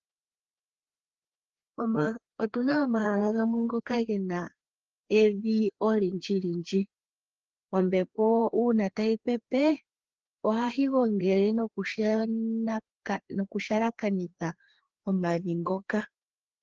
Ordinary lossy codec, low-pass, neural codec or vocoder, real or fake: Opus, 16 kbps; 7.2 kHz; codec, 16 kHz, 2 kbps, FreqCodec, larger model; fake